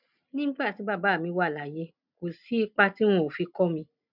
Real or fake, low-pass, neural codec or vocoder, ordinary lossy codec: real; 5.4 kHz; none; none